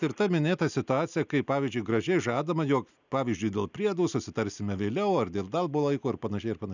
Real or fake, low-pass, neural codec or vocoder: real; 7.2 kHz; none